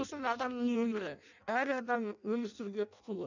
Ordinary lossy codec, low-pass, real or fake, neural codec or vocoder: none; 7.2 kHz; fake; codec, 16 kHz in and 24 kHz out, 0.6 kbps, FireRedTTS-2 codec